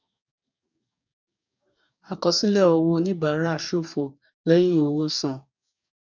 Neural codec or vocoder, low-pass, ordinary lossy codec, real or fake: codec, 44.1 kHz, 2.6 kbps, DAC; 7.2 kHz; none; fake